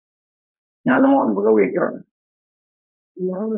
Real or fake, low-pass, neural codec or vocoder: fake; 3.6 kHz; codec, 16 kHz, 4.8 kbps, FACodec